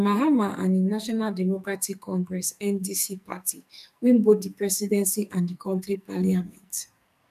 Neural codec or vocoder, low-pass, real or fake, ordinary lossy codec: codec, 44.1 kHz, 2.6 kbps, SNAC; 14.4 kHz; fake; none